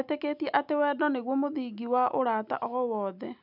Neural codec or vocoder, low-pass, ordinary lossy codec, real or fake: none; 5.4 kHz; none; real